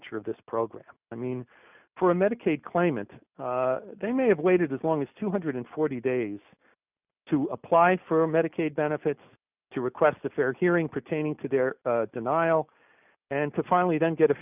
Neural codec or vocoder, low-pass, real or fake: none; 3.6 kHz; real